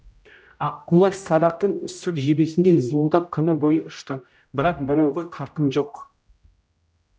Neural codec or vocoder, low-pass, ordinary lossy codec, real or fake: codec, 16 kHz, 0.5 kbps, X-Codec, HuBERT features, trained on general audio; none; none; fake